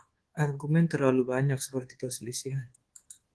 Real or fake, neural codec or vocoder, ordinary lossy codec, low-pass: fake; codec, 24 kHz, 1.2 kbps, DualCodec; Opus, 16 kbps; 10.8 kHz